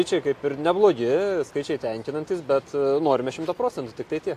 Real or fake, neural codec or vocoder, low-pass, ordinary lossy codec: real; none; 14.4 kHz; AAC, 64 kbps